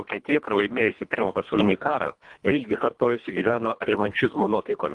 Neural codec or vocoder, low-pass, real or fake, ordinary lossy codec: codec, 24 kHz, 1.5 kbps, HILCodec; 10.8 kHz; fake; Opus, 24 kbps